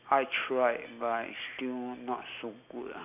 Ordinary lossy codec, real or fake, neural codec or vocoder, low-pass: none; real; none; 3.6 kHz